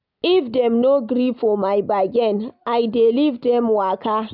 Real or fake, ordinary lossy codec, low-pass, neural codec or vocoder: real; none; 5.4 kHz; none